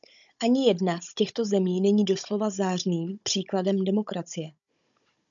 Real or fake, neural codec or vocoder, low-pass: fake; codec, 16 kHz, 16 kbps, FunCodec, trained on Chinese and English, 50 frames a second; 7.2 kHz